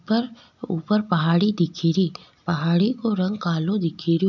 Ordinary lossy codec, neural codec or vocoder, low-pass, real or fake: none; none; 7.2 kHz; real